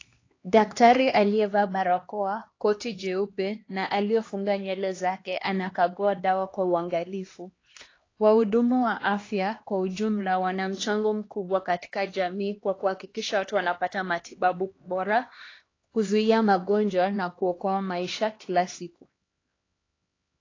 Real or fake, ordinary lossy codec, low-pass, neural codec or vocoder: fake; AAC, 32 kbps; 7.2 kHz; codec, 16 kHz, 2 kbps, X-Codec, HuBERT features, trained on LibriSpeech